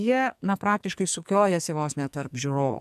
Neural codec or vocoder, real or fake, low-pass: codec, 44.1 kHz, 2.6 kbps, SNAC; fake; 14.4 kHz